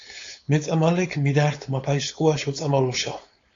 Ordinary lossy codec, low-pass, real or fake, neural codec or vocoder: AAC, 48 kbps; 7.2 kHz; fake; codec, 16 kHz, 4.8 kbps, FACodec